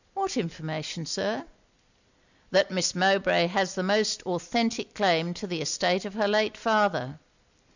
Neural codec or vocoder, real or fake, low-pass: none; real; 7.2 kHz